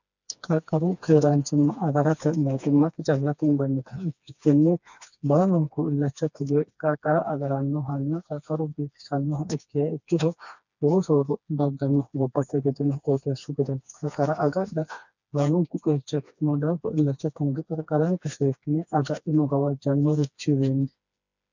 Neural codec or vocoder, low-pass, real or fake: codec, 16 kHz, 2 kbps, FreqCodec, smaller model; 7.2 kHz; fake